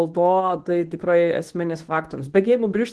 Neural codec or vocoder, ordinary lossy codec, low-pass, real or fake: codec, 24 kHz, 0.9 kbps, WavTokenizer, medium speech release version 1; Opus, 16 kbps; 10.8 kHz; fake